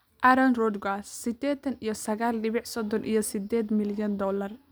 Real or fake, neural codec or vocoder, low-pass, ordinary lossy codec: real; none; none; none